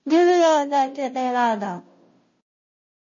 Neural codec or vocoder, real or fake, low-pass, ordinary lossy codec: codec, 16 kHz, 0.5 kbps, FunCodec, trained on Chinese and English, 25 frames a second; fake; 7.2 kHz; MP3, 32 kbps